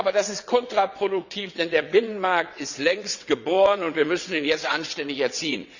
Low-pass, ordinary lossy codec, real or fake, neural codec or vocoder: 7.2 kHz; none; fake; vocoder, 22.05 kHz, 80 mel bands, WaveNeXt